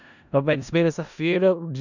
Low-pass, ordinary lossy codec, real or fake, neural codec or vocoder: 7.2 kHz; none; fake; codec, 16 kHz in and 24 kHz out, 0.4 kbps, LongCat-Audio-Codec, four codebook decoder